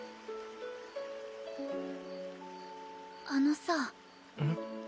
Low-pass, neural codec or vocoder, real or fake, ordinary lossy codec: none; none; real; none